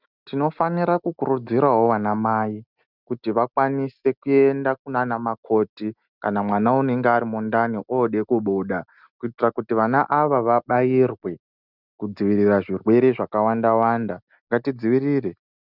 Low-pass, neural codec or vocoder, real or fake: 5.4 kHz; none; real